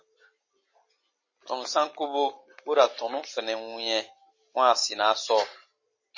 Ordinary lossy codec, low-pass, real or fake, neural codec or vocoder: MP3, 32 kbps; 7.2 kHz; real; none